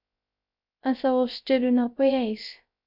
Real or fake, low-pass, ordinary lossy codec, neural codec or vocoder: fake; 5.4 kHz; MP3, 48 kbps; codec, 16 kHz, 0.3 kbps, FocalCodec